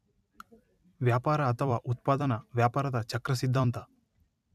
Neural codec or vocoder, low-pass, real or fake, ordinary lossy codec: vocoder, 44.1 kHz, 128 mel bands every 256 samples, BigVGAN v2; 14.4 kHz; fake; none